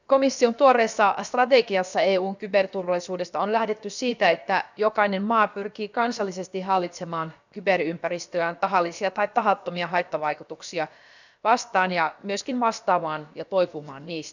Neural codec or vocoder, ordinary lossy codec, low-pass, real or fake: codec, 16 kHz, about 1 kbps, DyCAST, with the encoder's durations; none; 7.2 kHz; fake